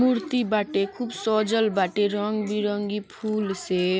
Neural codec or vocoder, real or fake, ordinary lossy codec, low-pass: none; real; none; none